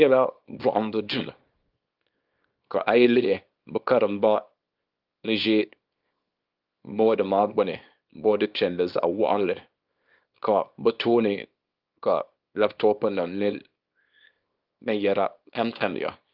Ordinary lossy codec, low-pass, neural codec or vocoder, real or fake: Opus, 24 kbps; 5.4 kHz; codec, 24 kHz, 0.9 kbps, WavTokenizer, small release; fake